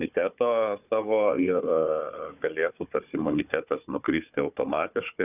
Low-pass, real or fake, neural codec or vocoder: 3.6 kHz; fake; codec, 16 kHz, 4 kbps, FunCodec, trained on Chinese and English, 50 frames a second